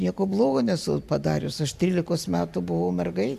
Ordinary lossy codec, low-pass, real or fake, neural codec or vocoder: MP3, 96 kbps; 14.4 kHz; fake; vocoder, 44.1 kHz, 128 mel bands every 512 samples, BigVGAN v2